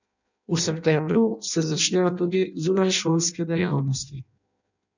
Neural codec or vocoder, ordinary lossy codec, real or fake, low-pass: codec, 16 kHz in and 24 kHz out, 0.6 kbps, FireRedTTS-2 codec; none; fake; 7.2 kHz